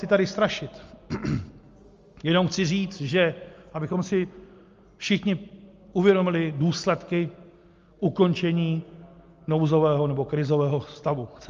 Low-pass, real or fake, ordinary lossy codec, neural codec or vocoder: 7.2 kHz; real; Opus, 32 kbps; none